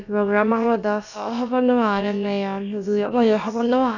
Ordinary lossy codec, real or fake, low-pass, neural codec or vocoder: none; fake; 7.2 kHz; codec, 16 kHz, about 1 kbps, DyCAST, with the encoder's durations